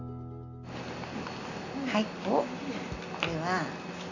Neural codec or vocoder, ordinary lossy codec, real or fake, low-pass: none; none; real; 7.2 kHz